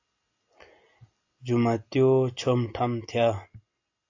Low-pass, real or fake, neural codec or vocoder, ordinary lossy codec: 7.2 kHz; real; none; AAC, 48 kbps